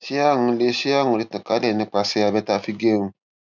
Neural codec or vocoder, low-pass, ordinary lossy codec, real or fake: none; 7.2 kHz; none; real